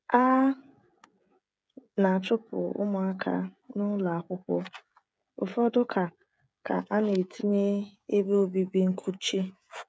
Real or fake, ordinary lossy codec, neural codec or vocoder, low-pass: fake; none; codec, 16 kHz, 16 kbps, FreqCodec, smaller model; none